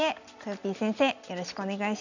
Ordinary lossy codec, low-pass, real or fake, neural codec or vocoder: none; 7.2 kHz; real; none